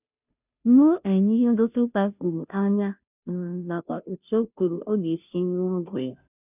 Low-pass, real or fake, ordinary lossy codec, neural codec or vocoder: 3.6 kHz; fake; none; codec, 16 kHz, 0.5 kbps, FunCodec, trained on Chinese and English, 25 frames a second